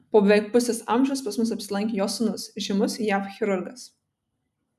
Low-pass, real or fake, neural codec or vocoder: 14.4 kHz; real; none